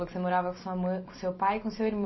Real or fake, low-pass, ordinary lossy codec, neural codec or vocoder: real; 7.2 kHz; MP3, 24 kbps; none